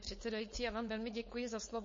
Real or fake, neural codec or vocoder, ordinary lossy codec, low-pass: fake; codec, 16 kHz, 4.8 kbps, FACodec; MP3, 32 kbps; 7.2 kHz